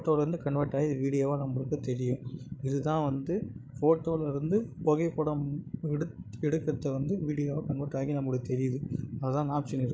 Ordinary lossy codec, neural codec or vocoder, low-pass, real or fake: none; codec, 16 kHz, 16 kbps, FreqCodec, larger model; none; fake